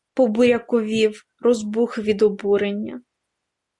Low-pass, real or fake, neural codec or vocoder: 10.8 kHz; real; none